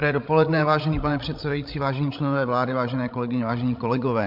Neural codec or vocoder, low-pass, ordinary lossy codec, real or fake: codec, 16 kHz, 16 kbps, FreqCodec, larger model; 5.4 kHz; MP3, 48 kbps; fake